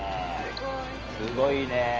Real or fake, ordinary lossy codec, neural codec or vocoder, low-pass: real; Opus, 24 kbps; none; 7.2 kHz